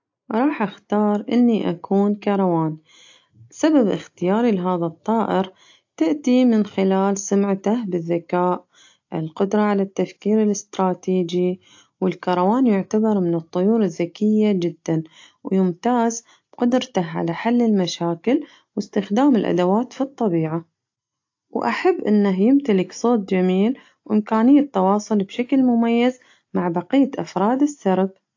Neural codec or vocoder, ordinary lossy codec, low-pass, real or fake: none; AAC, 48 kbps; 7.2 kHz; real